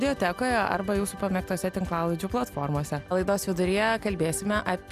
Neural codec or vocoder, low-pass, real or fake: none; 14.4 kHz; real